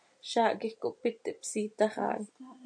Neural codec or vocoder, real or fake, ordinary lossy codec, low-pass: none; real; MP3, 48 kbps; 9.9 kHz